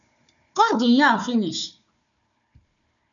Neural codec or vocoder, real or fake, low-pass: codec, 16 kHz, 4 kbps, FunCodec, trained on Chinese and English, 50 frames a second; fake; 7.2 kHz